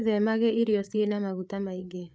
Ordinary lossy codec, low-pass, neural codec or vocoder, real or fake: none; none; codec, 16 kHz, 8 kbps, FreqCodec, larger model; fake